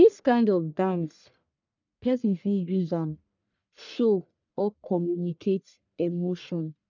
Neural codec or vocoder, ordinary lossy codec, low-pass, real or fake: codec, 44.1 kHz, 1.7 kbps, Pupu-Codec; none; 7.2 kHz; fake